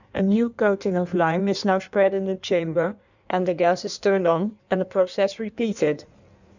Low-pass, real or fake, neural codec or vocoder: 7.2 kHz; fake; codec, 16 kHz in and 24 kHz out, 1.1 kbps, FireRedTTS-2 codec